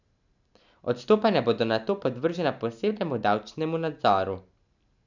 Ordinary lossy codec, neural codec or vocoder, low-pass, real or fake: none; none; 7.2 kHz; real